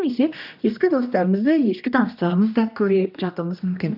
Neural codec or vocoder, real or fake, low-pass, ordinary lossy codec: codec, 16 kHz, 1 kbps, X-Codec, HuBERT features, trained on general audio; fake; 5.4 kHz; none